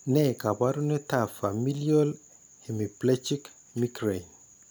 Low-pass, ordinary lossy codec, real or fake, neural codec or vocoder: none; none; real; none